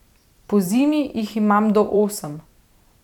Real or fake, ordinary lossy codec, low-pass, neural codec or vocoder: fake; none; 19.8 kHz; vocoder, 44.1 kHz, 128 mel bands every 512 samples, BigVGAN v2